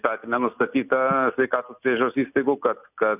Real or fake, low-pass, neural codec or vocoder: real; 3.6 kHz; none